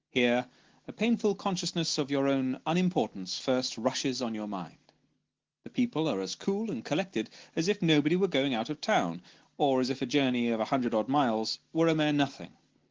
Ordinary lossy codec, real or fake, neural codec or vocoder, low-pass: Opus, 16 kbps; real; none; 7.2 kHz